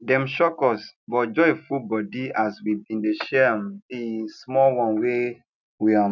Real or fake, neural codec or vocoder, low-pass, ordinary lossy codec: real; none; 7.2 kHz; none